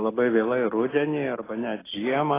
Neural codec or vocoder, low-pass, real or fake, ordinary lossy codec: none; 3.6 kHz; real; AAC, 16 kbps